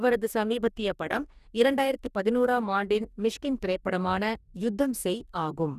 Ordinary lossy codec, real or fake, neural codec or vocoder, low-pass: none; fake; codec, 44.1 kHz, 2.6 kbps, DAC; 14.4 kHz